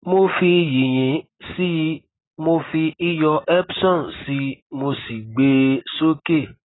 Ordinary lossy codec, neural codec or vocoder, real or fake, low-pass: AAC, 16 kbps; none; real; 7.2 kHz